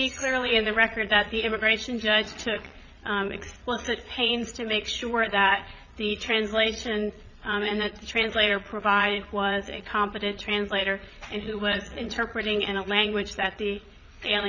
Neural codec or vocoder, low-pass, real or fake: vocoder, 22.05 kHz, 80 mel bands, Vocos; 7.2 kHz; fake